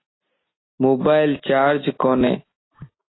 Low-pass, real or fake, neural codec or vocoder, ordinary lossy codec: 7.2 kHz; real; none; AAC, 16 kbps